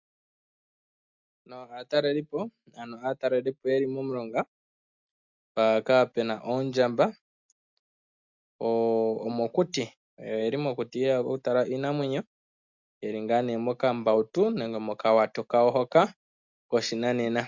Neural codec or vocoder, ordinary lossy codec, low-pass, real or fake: none; MP3, 64 kbps; 7.2 kHz; real